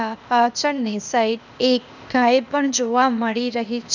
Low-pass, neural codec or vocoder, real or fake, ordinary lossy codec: 7.2 kHz; codec, 16 kHz, 0.8 kbps, ZipCodec; fake; none